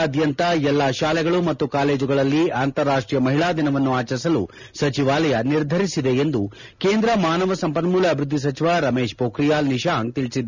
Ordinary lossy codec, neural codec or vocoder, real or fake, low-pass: none; none; real; 7.2 kHz